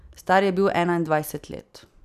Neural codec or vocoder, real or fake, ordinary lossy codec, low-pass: none; real; none; 14.4 kHz